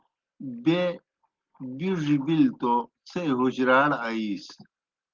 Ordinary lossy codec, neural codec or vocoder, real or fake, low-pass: Opus, 16 kbps; none; real; 7.2 kHz